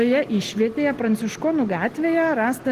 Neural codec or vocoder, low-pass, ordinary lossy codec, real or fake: none; 14.4 kHz; Opus, 16 kbps; real